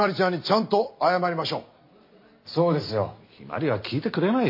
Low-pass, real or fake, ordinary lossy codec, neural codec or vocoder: 5.4 kHz; real; MP3, 32 kbps; none